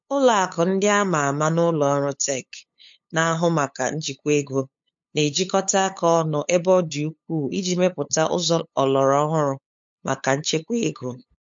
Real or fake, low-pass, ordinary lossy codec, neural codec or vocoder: fake; 7.2 kHz; MP3, 48 kbps; codec, 16 kHz, 8 kbps, FunCodec, trained on LibriTTS, 25 frames a second